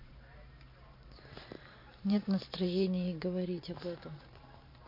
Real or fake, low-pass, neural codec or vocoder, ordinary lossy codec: fake; 5.4 kHz; vocoder, 44.1 kHz, 128 mel bands every 512 samples, BigVGAN v2; MP3, 32 kbps